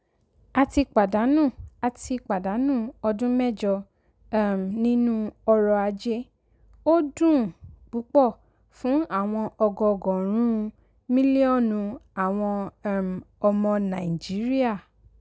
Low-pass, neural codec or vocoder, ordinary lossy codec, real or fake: none; none; none; real